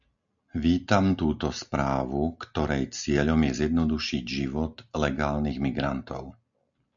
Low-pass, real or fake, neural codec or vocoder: 7.2 kHz; real; none